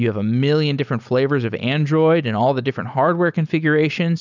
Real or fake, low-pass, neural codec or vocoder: real; 7.2 kHz; none